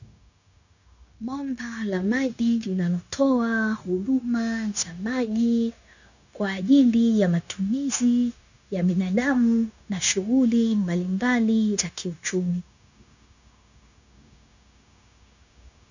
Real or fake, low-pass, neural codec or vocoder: fake; 7.2 kHz; codec, 16 kHz, 0.9 kbps, LongCat-Audio-Codec